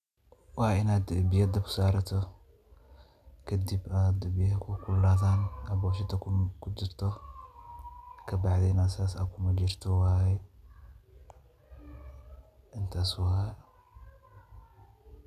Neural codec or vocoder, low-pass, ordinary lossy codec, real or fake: none; 14.4 kHz; none; real